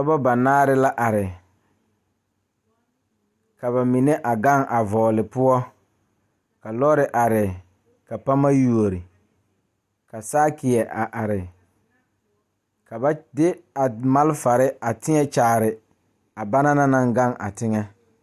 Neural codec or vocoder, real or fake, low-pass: none; real; 14.4 kHz